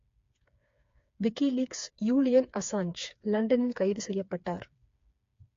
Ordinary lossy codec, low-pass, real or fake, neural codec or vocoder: AAC, 48 kbps; 7.2 kHz; fake; codec, 16 kHz, 4 kbps, FreqCodec, smaller model